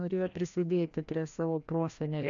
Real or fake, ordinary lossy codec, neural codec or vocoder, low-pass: fake; MP3, 64 kbps; codec, 16 kHz, 1 kbps, FreqCodec, larger model; 7.2 kHz